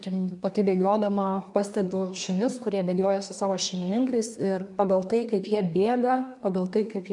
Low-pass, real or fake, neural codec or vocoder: 10.8 kHz; fake; codec, 24 kHz, 1 kbps, SNAC